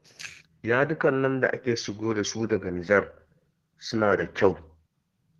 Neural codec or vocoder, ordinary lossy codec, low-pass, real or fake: codec, 32 kHz, 1.9 kbps, SNAC; Opus, 16 kbps; 14.4 kHz; fake